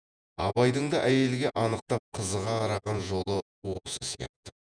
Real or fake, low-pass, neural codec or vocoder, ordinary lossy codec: fake; 9.9 kHz; vocoder, 48 kHz, 128 mel bands, Vocos; none